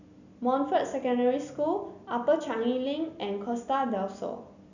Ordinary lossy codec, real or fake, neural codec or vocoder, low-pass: none; real; none; 7.2 kHz